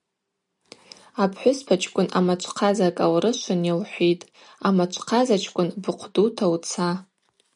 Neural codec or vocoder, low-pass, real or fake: none; 10.8 kHz; real